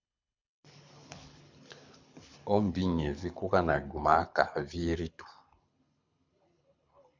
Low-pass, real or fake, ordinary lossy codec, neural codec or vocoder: 7.2 kHz; fake; MP3, 64 kbps; codec, 24 kHz, 6 kbps, HILCodec